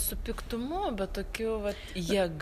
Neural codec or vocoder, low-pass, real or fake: none; 14.4 kHz; real